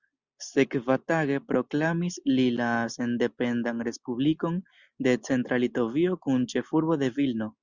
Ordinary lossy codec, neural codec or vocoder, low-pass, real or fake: Opus, 64 kbps; none; 7.2 kHz; real